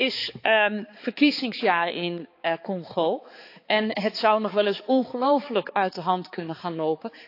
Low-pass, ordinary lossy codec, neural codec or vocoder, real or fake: 5.4 kHz; AAC, 32 kbps; codec, 16 kHz, 4 kbps, X-Codec, HuBERT features, trained on balanced general audio; fake